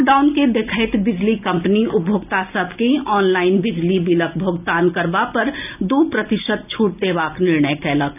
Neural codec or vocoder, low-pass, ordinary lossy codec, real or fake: none; 3.6 kHz; none; real